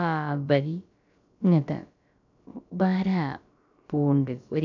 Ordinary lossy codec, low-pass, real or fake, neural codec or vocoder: none; 7.2 kHz; fake; codec, 16 kHz, about 1 kbps, DyCAST, with the encoder's durations